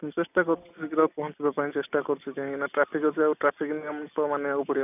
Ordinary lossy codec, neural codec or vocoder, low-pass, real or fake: none; none; 3.6 kHz; real